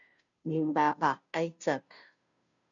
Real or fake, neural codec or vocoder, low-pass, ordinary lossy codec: fake; codec, 16 kHz, 0.5 kbps, FunCodec, trained on Chinese and English, 25 frames a second; 7.2 kHz; MP3, 96 kbps